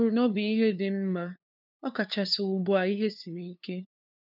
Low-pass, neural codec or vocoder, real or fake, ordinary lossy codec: 5.4 kHz; codec, 16 kHz, 2 kbps, FunCodec, trained on LibriTTS, 25 frames a second; fake; none